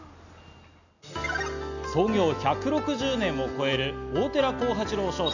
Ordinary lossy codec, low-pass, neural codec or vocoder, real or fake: none; 7.2 kHz; none; real